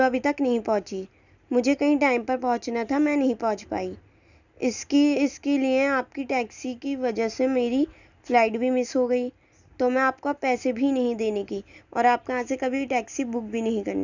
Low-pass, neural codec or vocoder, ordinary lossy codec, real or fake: 7.2 kHz; none; none; real